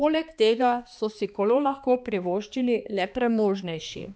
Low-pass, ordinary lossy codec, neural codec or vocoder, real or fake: none; none; codec, 16 kHz, 2 kbps, X-Codec, HuBERT features, trained on balanced general audio; fake